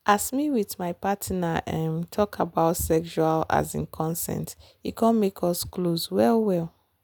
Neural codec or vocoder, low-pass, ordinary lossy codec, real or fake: none; none; none; real